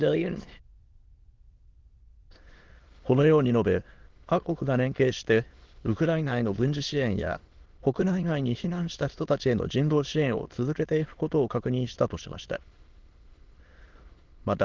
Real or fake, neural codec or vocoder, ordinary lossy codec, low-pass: fake; autoencoder, 22.05 kHz, a latent of 192 numbers a frame, VITS, trained on many speakers; Opus, 16 kbps; 7.2 kHz